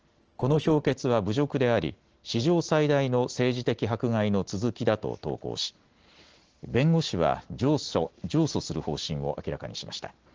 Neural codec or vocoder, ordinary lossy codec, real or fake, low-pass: none; Opus, 16 kbps; real; 7.2 kHz